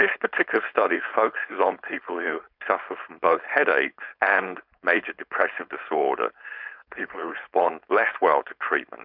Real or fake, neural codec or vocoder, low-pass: fake; codec, 16 kHz, 4.8 kbps, FACodec; 5.4 kHz